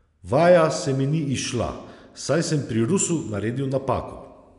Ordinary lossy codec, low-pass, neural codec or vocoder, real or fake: none; 10.8 kHz; none; real